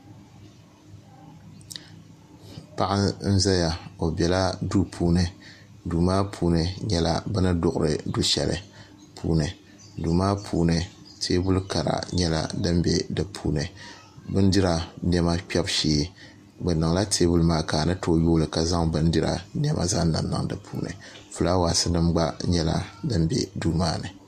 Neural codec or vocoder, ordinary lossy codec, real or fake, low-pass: none; MP3, 64 kbps; real; 14.4 kHz